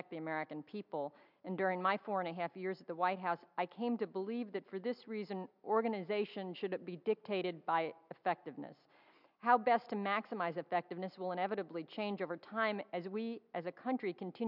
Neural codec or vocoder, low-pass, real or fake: none; 5.4 kHz; real